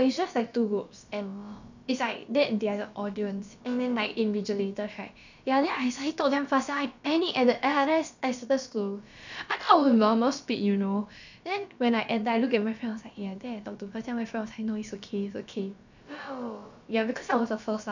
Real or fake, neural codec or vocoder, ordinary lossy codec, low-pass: fake; codec, 16 kHz, about 1 kbps, DyCAST, with the encoder's durations; none; 7.2 kHz